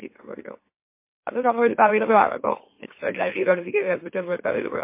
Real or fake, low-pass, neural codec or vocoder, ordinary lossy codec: fake; 3.6 kHz; autoencoder, 44.1 kHz, a latent of 192 numbers a frame, MeloTTS; MP3, 24 kbps